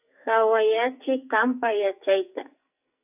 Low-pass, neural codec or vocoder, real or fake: 3.6 kHz; codec, 44.1 kHz, 2.6 kbps, SNAC; fake